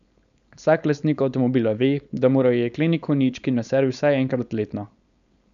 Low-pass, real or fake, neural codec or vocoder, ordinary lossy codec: 7.2 kHz; fake; codec, 16 kHz, 4.8 kbps, FACodec; none